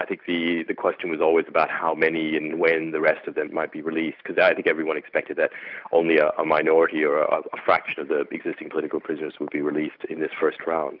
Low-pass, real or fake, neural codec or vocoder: 5.4 kHz; real; none